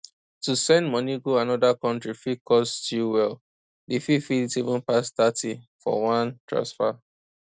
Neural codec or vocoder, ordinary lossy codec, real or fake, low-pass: none; none; real; none